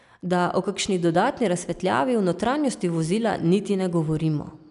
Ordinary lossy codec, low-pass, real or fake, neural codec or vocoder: none; 10.8 kHz; real; none